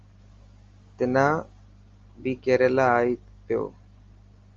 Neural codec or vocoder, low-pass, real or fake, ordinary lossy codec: none; 7.2 kHz; real; Opus, 32 kbps